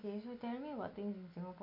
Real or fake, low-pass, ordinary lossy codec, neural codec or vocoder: real; 5.4 kHz; MP3, 32 kbps; none